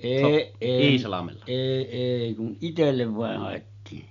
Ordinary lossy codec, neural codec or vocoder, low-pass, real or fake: none; none; 7.2 kHz; real